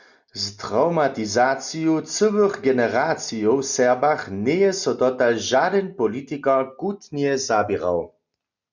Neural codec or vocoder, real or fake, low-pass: none; real; 7.2 kHz